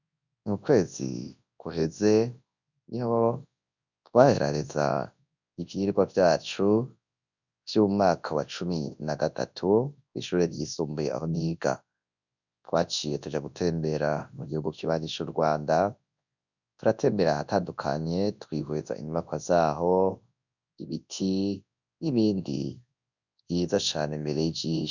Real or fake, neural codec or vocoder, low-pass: fake; codec, 24 kHz, 0.9 kbps, WavTokenizer, large speech release; 7.2 kHz